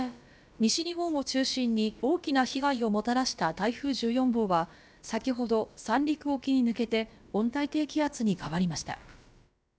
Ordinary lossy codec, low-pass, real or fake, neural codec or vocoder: none; none; fake; codec, 16 kHz, about 1 kbps, DyCAST, with the encoder's durations